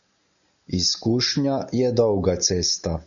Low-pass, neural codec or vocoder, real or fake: 7.2 kHz; none; real